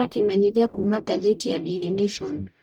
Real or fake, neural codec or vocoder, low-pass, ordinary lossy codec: fake; codec, 44.1 kHz, 0.9 kbps, DAC; 19.8 kHz; none